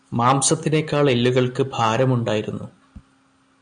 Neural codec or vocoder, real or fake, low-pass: none; real; 9.9 kHz